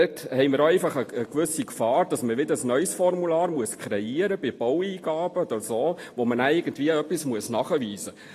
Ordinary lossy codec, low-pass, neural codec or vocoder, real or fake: AAC, 48 kbps; 14.4 kHz; none; real